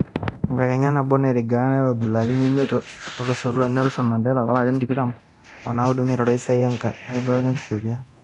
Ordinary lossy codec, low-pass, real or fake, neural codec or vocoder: none; 10.8 kHz; fake; codec, 24 kHz, 0.9 kbps, DualCodec